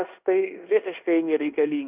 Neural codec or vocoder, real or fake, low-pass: codec, 16 kHz in and 24 kHz out, 0.9 kbps, LongCat-Audio-Codec, fine tuned four codebook decoder; fake; 3.6 kHz